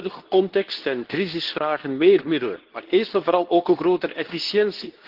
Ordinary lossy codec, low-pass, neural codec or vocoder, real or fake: Opus, 16 kbps; 5.4 kHz; codec, 24 kHz, 0.9 kbps, WavTokenizer, medium speech release version 2; fake